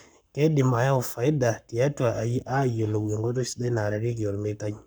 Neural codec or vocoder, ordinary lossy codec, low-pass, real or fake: codec, 44.1 kHz, 7.8 kbps, Pupu-Codec; none; none; fake